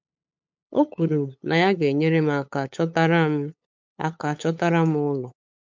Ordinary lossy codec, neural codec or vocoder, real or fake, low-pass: MP3, 64 kbps; codec, 16 kHz, 8 kbps, FunCodec, trained on LibriTTS, 25 frames a second; fake; 7.2 kHz